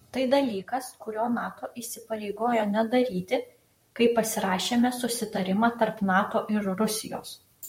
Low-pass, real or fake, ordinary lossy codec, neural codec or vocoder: 19.8 kHz; fake; MP3, 64 kbps; vocoder, 44.1 kHz, 128 mel bands, Pupu-Vocoder